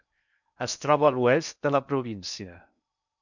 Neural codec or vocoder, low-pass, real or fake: codec, 16 kHz in and 24 kHz out, 0.8 kbps, FocalCodec, streaming, 65536 codes; 7.2 kHz; fake